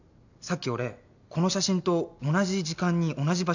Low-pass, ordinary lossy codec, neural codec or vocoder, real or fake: 7.2 kHz; none; none; real